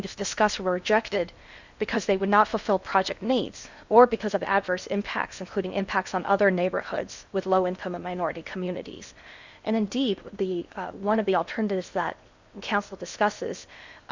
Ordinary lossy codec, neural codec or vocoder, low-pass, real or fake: Opus, 64 kbps; codec, 16 kHz in and 24 kHz out, 0.8 kbps, FocalCodec, streaming, 65536 codes; 7.2 kHz; fake